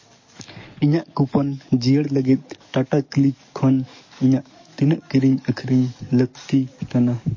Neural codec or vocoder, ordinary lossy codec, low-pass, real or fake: codec, 44.1 kHz, 7.8 kbps, DAC; MP3, 32 kbps; 7.2 kHz; fake